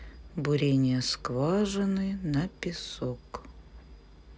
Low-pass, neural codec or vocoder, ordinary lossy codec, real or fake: none; none; none; real